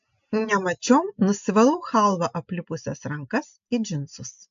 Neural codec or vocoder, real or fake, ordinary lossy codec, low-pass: none; real; AAC, 64 kbps; 7.2 kHz